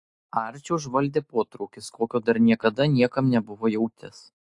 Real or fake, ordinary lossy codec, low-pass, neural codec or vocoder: real; AAC, 64 kbps; 10.8 kHz; none